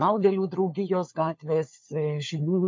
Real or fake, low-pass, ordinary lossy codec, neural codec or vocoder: fake; 7.2 kHz; MP3, 48 kbps; codec, 16 kHz, 4 kbps, FunCodec, trained on LibriTTS, 50 frames a second